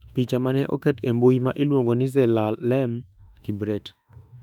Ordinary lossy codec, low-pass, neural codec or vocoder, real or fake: none; 19.8 kHz; autoencoder, 48 kHz, 32 numbers a frame, DAC-VAE, trained on Japanese speech; fake